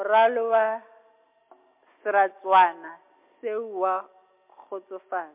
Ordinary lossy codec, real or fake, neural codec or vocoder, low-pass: MP3, 32 kbps; real; none; 3.6 kHz